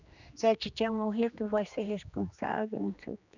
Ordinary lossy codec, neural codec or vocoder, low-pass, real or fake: none; codec, 16 kHz, 2 kbps, X-Codec, HuBERT features, trained on general audio; 7.2 kHz; fake